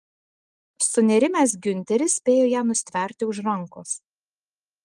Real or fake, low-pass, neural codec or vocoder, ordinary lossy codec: real; 10.8 kHz; none; Opus, 24 kbps